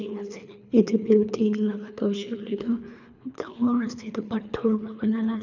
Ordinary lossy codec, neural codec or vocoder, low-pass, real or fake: none; codec, 24 kHz, 3 kbps, HILCodec; 7.2 kHz; fake